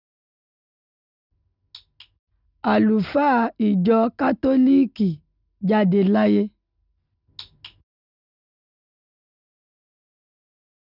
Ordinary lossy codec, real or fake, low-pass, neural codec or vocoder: none; real; 5.4 kHz; none